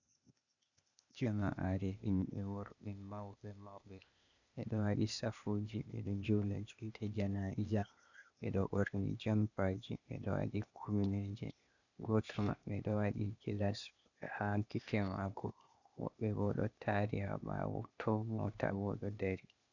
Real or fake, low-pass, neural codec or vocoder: fake; 7.2 kHz; codec, 16 kHz, 0.8 kbps, ZipCodec